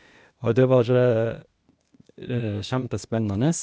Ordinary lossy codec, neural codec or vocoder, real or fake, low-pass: none; codec, 16 kHz, 0.8 kbps, ZipCodec; fake; none